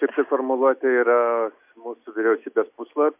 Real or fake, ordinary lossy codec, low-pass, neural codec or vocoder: real; MP3, 32 kbps; 3.6 kHz; none